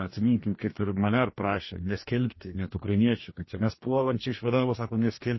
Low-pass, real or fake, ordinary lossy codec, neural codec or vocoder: 7.2 kHz; fake; MP3, 24 kbps; codec, 44.1 kHz, 2.6 kbps, DAC